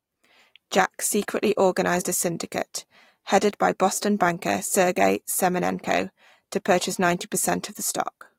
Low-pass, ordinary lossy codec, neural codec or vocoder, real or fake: 19.8 kHz; AAC, 48 kbps; vocoder, 44.1 kHz, 128 mel bands every 512 samples, BigVGAN v2; fake